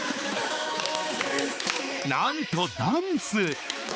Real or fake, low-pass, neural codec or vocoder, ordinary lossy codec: fake; none; codec, 16 kHz, 4 kbps, X-Codec, HuBERT features, trained on balanced general audio; none